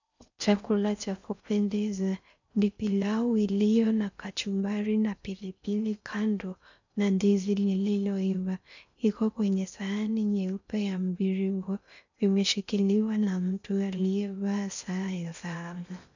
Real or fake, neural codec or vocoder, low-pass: fake; codec, 16 kHz in and 24 kHz out, 0.6 kbps, FocalCodec, streaming, 4096 codes; 7.2 kHz